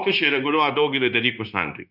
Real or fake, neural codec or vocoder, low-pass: fake; codec, 16 kHz, 0.9 kbps, LongCat-Audio-Codec; 5.4 kHz